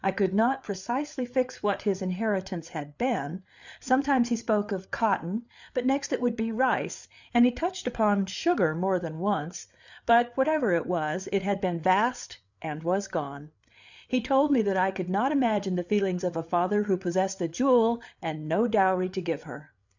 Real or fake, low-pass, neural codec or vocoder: fake; 7.2 kHz; codec, 16 kHz, 8 kbps, FreqCodec, larger model